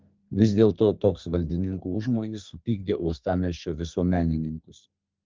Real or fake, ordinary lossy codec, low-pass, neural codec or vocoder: fake; Opus, 24 kbps; 7.2 kHz; codec, 32 kHz, 1.9 kbps, SNAC